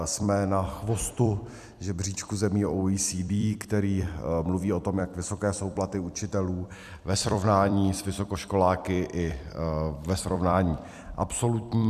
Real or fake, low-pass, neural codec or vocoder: fake; 14.4 kHz; vocoder, 44.1 kHz, 128 mel bands every 256 samples, BigVGAN v2